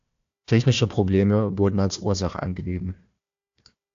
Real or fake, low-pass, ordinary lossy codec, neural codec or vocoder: fake; 7.2 kHz; AAC, 64 kbps; codec, 16 kHz, 1 kbps, FunCodec, trained on Chinese and English, 50 frames a second